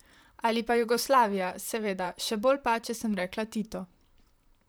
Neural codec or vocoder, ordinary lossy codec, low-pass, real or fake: vocoder, 44.1 kHz, 128 mel bands, Pupu-Vocoder; none; none; fake